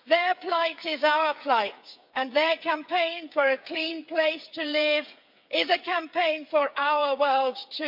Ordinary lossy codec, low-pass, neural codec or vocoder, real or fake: MP3, 48 kbps; 5.4 kHz; codec, 16 kHz, 8 kbps, FreqCodec, smaller model; fake